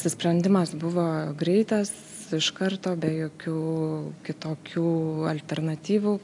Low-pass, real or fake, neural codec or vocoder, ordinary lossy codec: 10.8 kHz; real; none; AAC, 64 kbps